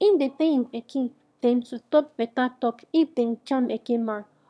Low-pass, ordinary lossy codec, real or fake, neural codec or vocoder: none; none; fake; autoencoder, 22.05 kHz, a latent of 192 numbers a frame, VITS, trained on one speaker